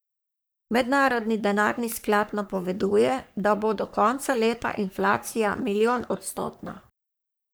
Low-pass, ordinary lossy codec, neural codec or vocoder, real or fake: none; none; codec, 44.1 kHz, 3.4 kbps, Pupu-Codec; fake